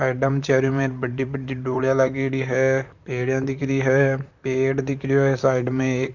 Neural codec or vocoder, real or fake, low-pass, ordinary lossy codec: vocoder, 44.1 kHz, 128 mel bands, Pupu-Vocoder; fake; 7.2 kHz; none